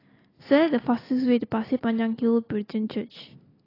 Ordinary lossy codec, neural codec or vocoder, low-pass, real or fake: AAC, 24 kbps; none; 5.4 kHz; real